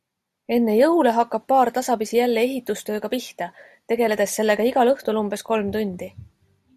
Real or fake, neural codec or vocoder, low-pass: real; none; 14.4 kHz